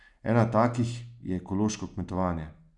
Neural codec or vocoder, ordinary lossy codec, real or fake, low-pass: none; none; real; 10.8 kHz